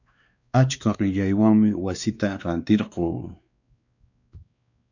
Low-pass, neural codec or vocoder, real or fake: 7.2 kHz; codec, 16 kHz, 2 kbps, X-Codec, WavLM features, trained on Multilingual LibriSpeech; fake